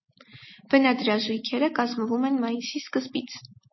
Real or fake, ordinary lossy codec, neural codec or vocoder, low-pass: real; MP3, 24 kbps; none; 7.2 kHz